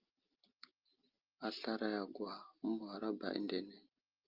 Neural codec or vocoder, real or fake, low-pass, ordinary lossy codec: none; real; 5.4 kHz; Opus, 24 kbps